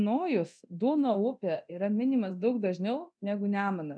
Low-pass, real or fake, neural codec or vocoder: 9.9 kHz; fake; codec, 24 kHz, 0.9 kbps, DualCodec